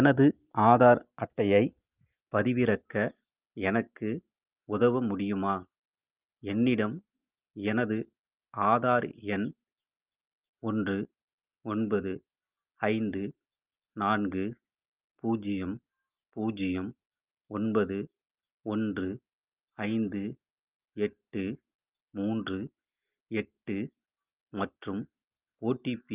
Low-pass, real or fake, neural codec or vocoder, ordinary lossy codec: 3.6 kHz; real; none; Opus, 24 kbps